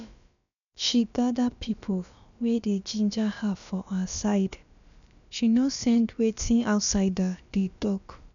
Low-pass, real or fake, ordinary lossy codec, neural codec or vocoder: 7.2 kHz; fake; none; codec, 16 kHz, about 1 kbps, DyCAST, with the encoder's durations